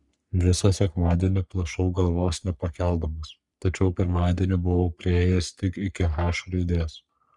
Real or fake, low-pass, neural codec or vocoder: fake; 10.8 kHz; codec, 44.1 kHz, 3.4 kbps, Pupu-Codec